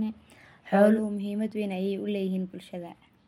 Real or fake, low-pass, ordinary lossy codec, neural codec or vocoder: real; 19.8 kHz; MP3, 64 kbps; none